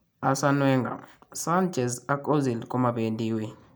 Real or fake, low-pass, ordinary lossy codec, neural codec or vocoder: real; none; none; none